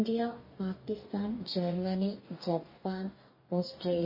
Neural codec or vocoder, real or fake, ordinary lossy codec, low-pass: codec, 44.1 kHz, 2.6 kbps, DAC; fake; MP3, 24 kbps; 5.4 kHz